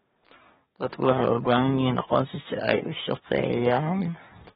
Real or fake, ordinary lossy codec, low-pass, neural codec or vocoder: fake; AAC, 16 kbps; 10.8 kHz; codec, 24 kHz, 1 kbps, SNAC